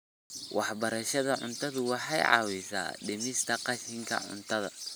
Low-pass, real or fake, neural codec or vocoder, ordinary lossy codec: none; real; none; none